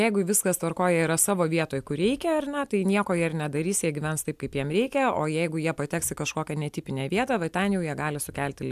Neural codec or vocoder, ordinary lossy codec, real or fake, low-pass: none; AAC, 96 kbps; real; 14.4 kHz